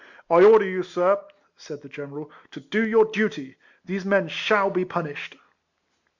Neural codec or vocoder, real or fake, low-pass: none; real; 7.2 kHz